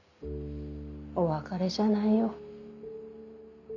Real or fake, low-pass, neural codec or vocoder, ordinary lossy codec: real; 7.2 kHz; none; none